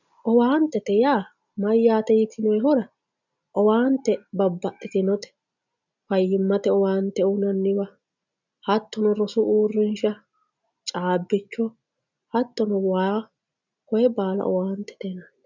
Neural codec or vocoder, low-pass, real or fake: none; 7.2 kHz; real